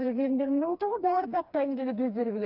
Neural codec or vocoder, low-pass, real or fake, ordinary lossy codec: codec, 16 kHz, 2 kbps, FreqCodec, smaller model; 5.4 kHz; fake; none